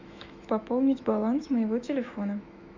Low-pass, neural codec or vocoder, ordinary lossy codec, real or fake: 7.2 kHz; autoencoder, 48 kHz, 128 numbers a frame, DAC-VAE, trained on Japanese speech; MP3, 64 kbps; fake